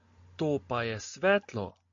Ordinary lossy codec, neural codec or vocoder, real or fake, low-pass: MP3, 64 kbps; none; real; 7.2 kHz